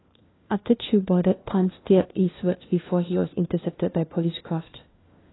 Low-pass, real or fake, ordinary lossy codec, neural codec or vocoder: 7.2 kHz; fake; AAC, 16 kbps; codec, 16 kHz, 1 kbps, FunCodec, trained on LibriTTS, 50 frames a second